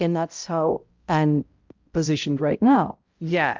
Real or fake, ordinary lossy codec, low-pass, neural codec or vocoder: fake; Opus, 24 kbps; 7.2 kHz; codec, 16 kHz, 0.5 kbps, X-Codec, HuBERT features, trained on balanced general audio